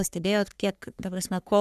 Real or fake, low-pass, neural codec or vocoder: fake; 14.4 kHz; codec, 44.1 kHz, 3.4 kbps, Pupu-Codec